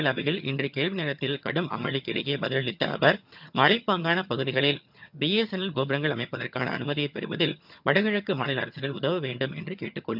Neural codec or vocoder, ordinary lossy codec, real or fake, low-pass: vocoder, 22.05 kHz, 80 mel bands, HiFi-GAN; none; fake; 5.4 kHz